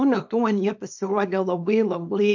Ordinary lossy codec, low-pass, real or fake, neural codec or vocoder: MP3, 48 kbps; 7.2 kHz; fake; codec, 24 kHz, 0.9 kbps, WavTokenizer, small release